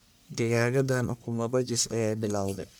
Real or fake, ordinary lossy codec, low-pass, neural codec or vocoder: fake; none; none; codec, 44.1 kHz, 1.7 kbps, Pupu-Codec